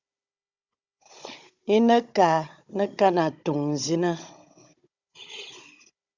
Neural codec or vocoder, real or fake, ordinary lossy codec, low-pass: codec, 16 kHz, 16 kbps, FunCodec, trained on Chinese and English, 50 frames a second; fake; Opus, 64 kbps; 7.2 kHz